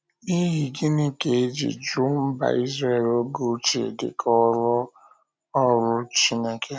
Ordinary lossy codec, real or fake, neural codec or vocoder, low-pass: none; real; none; none